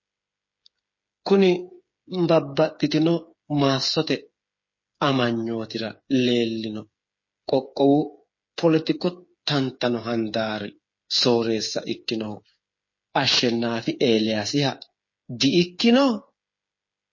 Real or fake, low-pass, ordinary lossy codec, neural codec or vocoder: fake; 7.2 kHz; MP3, 32 kbps; codec, 16 kHz, 8 kbps, FreqCodec, smaller model